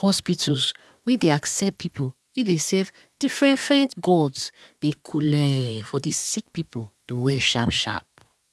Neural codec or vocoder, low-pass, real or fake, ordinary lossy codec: codec, 24 kHz, 1 kbps, SNAC; none; fake; none